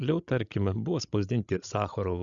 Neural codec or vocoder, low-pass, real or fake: codec, 16 kHz, 16 kbps, FreqCodec, larger model; 7.2 kHz; fake